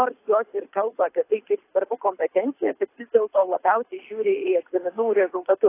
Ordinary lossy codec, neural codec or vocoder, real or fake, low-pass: AAC, 24 kbps; codec, 24 kHz, 6 kbps, HILCodec; fake; 3.6 kHz